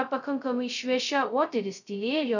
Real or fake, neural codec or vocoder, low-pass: fake; codec, 16 kHz, 0.2 kbps, FocalCodec; 7.2 kHz